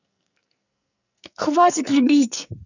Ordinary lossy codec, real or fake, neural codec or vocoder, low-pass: none; fake; codec, 44.1 kHz, 2.6 kbps, SNAC; 7.2 kHz